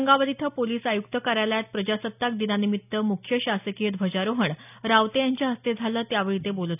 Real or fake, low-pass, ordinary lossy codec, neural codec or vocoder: real; 3.6 kHz; none; none